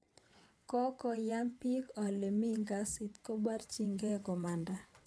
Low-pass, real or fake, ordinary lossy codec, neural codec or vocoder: none; fake; none; vocoder, 22.05 kHz, 80 mel bands, WaveNeXt